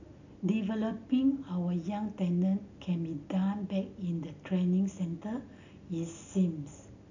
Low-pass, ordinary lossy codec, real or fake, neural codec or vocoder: 7.2 kHz; none; real; none